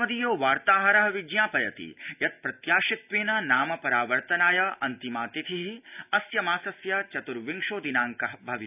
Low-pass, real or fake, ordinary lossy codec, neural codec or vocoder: 3.6 kHz; real; none; none